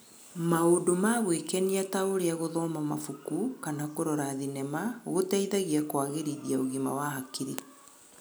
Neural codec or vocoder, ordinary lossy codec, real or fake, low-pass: none; none; real; none